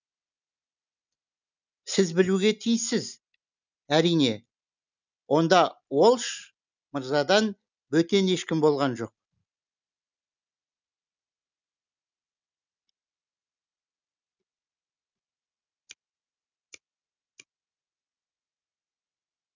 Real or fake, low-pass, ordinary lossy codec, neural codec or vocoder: real; 7.2 kHz; none; none